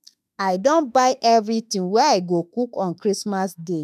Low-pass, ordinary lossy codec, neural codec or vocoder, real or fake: 14.4 kHz; none; autoencoder, 48 kHz, 32 numbers a frame, DAC-VAE, trained on Japanese speech; fake